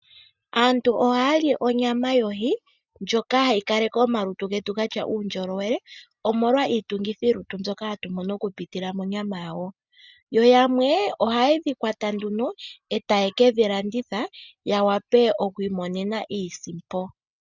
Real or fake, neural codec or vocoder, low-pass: real; none; 7.2 kHz